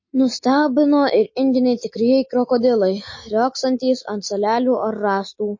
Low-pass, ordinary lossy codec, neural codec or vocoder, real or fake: 7.2 kHz; MP3, 32 kbps; none; real